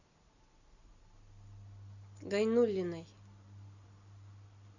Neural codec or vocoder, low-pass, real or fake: none; 7.2 kHz; real